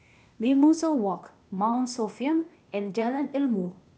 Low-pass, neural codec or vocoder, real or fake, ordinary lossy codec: none; codec, 16 kHz, 0.8 kbps, ZipCodec; fake; none